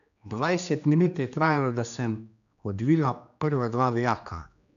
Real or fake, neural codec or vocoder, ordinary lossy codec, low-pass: fake; codec, 16 kHz, 2 kbps, X-Codec, HuBERT features, trained on general audio; none; 7.2 kHz